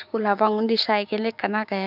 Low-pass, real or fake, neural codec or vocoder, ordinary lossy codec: 5.4 kHz; fake; codec, 16 kHz, 6 kbps, DAC; none